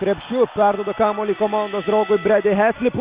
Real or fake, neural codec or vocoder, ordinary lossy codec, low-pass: real; none; Opus, 64 kbps; 3.6 kHz